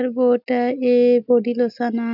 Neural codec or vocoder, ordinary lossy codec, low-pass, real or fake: none; none; 5.4 kHz; real